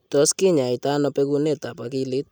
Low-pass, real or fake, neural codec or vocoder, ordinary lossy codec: 19.8 kHz; real; none; none